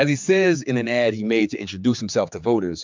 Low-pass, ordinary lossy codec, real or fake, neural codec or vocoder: 7.2 kHz; MP3, 64 kbps; fake; codec, 16 kHz, 4 kbps, X-Codec, HuBERT features, trained on general audio